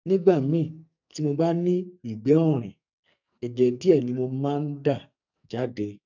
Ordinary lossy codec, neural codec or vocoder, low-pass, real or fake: none; codec, 44.1 kHz, 2.6 kbps, SNAC; 7.2 kHz; fake